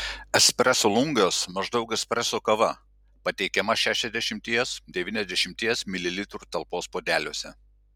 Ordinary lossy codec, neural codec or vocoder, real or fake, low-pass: MP3, 96 kbps; none; real; 19.8 kHz